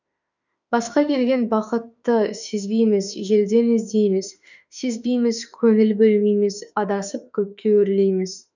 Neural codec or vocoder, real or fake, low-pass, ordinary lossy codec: autoencoder, 48 kHz, 32 numbers a frame, DAC-VAE, trained on Japanese speech; fake; 7.2 kHz; none